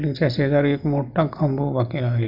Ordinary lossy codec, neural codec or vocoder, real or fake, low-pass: none; none; real; 5.4 kHz